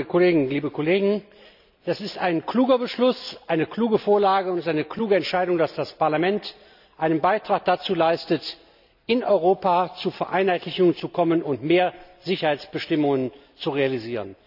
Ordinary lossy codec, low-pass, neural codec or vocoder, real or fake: none; 5.4 kHz; none; real